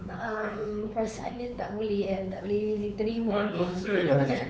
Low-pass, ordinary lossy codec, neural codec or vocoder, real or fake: none; none; codec, 16 kHz, 4 kbps, X-Codec, WavLM features, trained on Multilingual LibriSpeech; fake